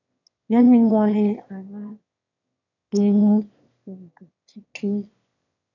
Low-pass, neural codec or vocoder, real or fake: 7.2 kHz; autoencoder, 22.05 kHz, a latent of 192 numbers a frame, VITS, trained on one speaker; fake